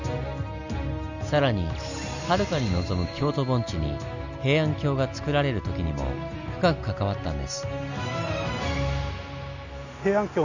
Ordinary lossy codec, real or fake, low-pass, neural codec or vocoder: none; real; 7.2 kHz; none